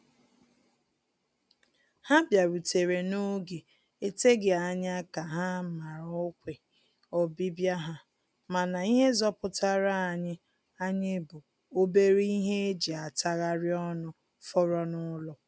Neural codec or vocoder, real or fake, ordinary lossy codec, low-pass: none; real; none; none